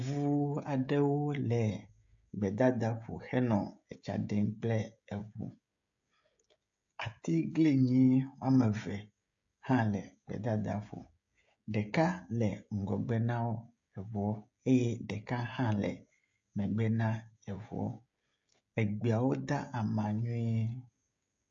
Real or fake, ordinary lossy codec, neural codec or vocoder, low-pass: fake; AAC, 64 kbps; codec, 16 kHz, 16 kbps, FreqCodec, smaller model; 7.2 kHz